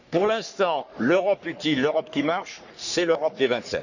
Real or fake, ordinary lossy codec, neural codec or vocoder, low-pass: fake; none; codec, 44.1 kHz, 3.4 kbps, Pupu-Codec; 7.2 kHz